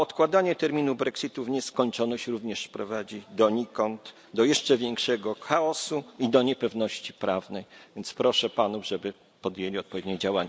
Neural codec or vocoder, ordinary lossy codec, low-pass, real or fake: none; none; none; real